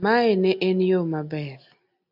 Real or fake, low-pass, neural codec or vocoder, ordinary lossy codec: real; 5.4 kHz; none; AAC, 48 kbps